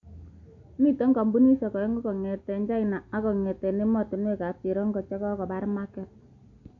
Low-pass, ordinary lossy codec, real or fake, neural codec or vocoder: 7.2 kHz; AAC, 48 kbps; real; none